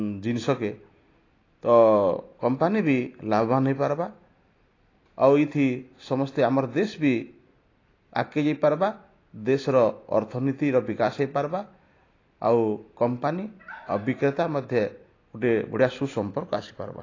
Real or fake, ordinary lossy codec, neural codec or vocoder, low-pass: real; AAC, 32 kbps; none; 7.2 kHz